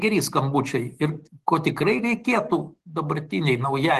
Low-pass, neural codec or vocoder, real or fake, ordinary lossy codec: 14.4 kHz; none; real; Opus, 16 kbps